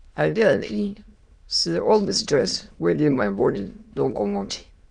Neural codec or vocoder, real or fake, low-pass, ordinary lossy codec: autoencoder, 22.05 kHz, a latent of 192 numbers a frame, VITS, trained on many speakers; fake; 9.9 kHz; Opus, 64 kbps